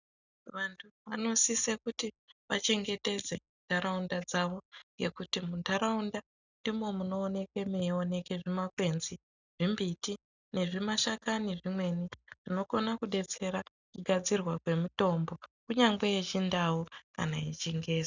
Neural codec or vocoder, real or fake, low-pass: none; real; 7.2 kHz